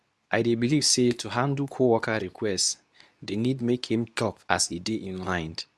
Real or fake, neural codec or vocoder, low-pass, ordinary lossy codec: fake; codec, 24 kHz, 0.9 kbps, WavTokenizer, medium speech release version 2; none; none